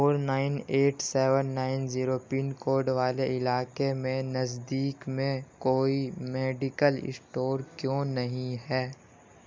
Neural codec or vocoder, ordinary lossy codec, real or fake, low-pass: none; none; real; none